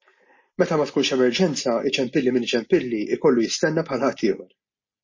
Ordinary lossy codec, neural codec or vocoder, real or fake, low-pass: MP3, 32 kbps; none; real; 7.2 kHz